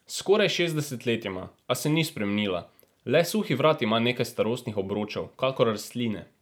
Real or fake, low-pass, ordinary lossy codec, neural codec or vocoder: real; none; none; none